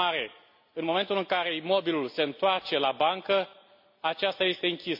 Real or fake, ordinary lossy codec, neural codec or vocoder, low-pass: real; MP3, 32 kbps; none; 5.4 kHz